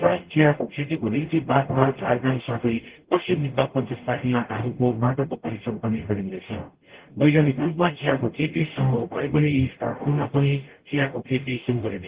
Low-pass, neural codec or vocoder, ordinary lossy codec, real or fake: 3.6 kHz; codec, 44.1 kHz, 0.9 kbps, DAC; Opus, 16 kbps; fake